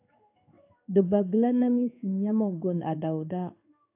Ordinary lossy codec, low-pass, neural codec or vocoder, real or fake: AAC, 32 kbps; 3.6 kHz; codec, 16 kHz in and 24 kHz out, 1 kbps, XY-Tokenizer; fake